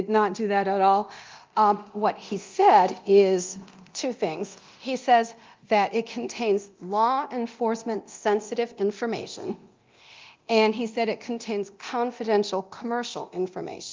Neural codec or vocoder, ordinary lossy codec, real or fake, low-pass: codec, 24 kHz, 0.9 kbps, DualCodec; Opus, 32 kbps; fake; 7.2 kHz